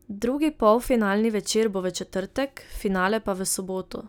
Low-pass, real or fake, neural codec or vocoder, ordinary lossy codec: none; real; none; none